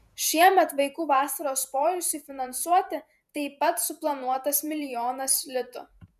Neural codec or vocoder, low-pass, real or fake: vocoder, 48 kHz, 128 mel bands, Vocos; 14.4 kHz; fake